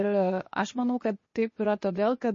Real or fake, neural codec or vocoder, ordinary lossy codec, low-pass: fake; codec, 16 kHz, 0.8 kbps, ZipCodec; MP3, 32 kbps; 7.2 kHz